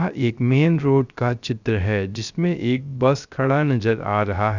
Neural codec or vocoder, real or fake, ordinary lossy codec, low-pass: codec, 16 kHz, 0.3 kbps, FocalCodec; fake; none; 7.2 kHz